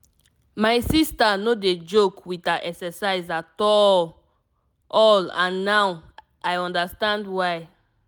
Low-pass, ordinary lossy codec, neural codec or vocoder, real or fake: none; none; none; real